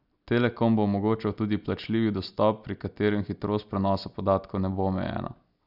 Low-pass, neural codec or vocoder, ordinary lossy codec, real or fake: 5.4 kHz; none; none; real